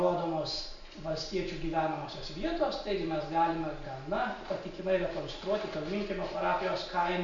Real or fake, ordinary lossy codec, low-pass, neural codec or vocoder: real; MP3, 48 kbps; 7.2 kHz; none